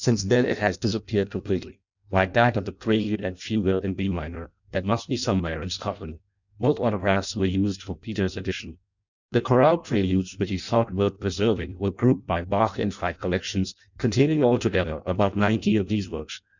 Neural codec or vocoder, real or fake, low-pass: codec, 16 kHz in and 24 kHz out, 0.6 kbps, FireRedTTS-2 codec; fake; 7.2 kHz